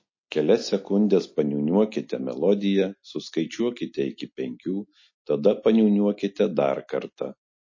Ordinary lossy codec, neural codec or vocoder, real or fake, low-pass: MP3, 32 kbps; none; real; 7.2 kHz